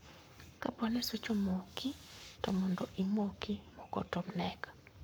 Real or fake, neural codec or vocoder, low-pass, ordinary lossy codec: fake; codec, 44.1 kHz, 7.8 kbps, Pupu-Codec; none; none